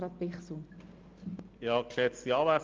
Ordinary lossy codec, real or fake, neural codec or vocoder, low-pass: Opus, 24 kbps; real; none; 7.2 kHz